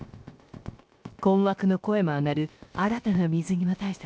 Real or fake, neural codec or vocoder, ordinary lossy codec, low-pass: fake; codec, 16 kHz, 0.7 kbps, FocalCodec; none; none